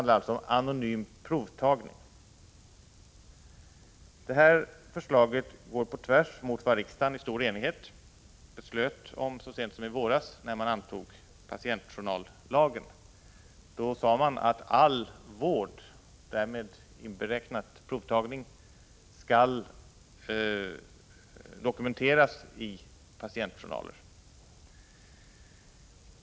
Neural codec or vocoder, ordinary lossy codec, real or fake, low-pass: none; none; real; none